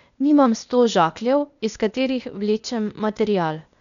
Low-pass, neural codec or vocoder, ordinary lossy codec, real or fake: 7.2 kHz; codec, 16 kHz, 0.8 kbps, ZipCodec; none; fake